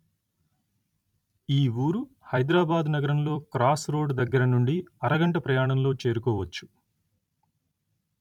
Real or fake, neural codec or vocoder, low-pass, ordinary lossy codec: fake; vocoder, 44.1 kHz, 128 mel bands every 256 samples, BigVGAN v2; 19.8 kHz; none